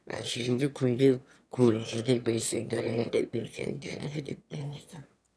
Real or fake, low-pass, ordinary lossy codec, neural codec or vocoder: fake; none; none; autoencoder, 22.05 kHz, a latent of 192 numbers a frame, VITS, trained on one speaker